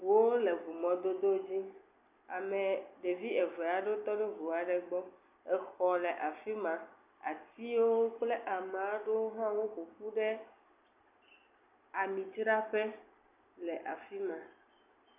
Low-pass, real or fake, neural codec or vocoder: 3.6 kHz; real; none